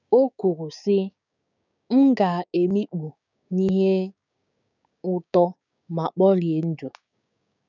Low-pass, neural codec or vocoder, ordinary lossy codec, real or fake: 7.2 kHz; codec, 16 kHz, 6 kbps, DAC; none; fake